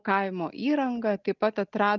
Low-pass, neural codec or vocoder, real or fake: 7.2 kHz; none; real